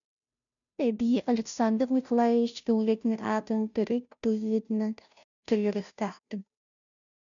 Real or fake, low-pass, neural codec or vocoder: fake; 7.2 kHz; codec, 16 kHz, 0.5 kbps, FunCodec, trained on Chinese and English, 25 frames a second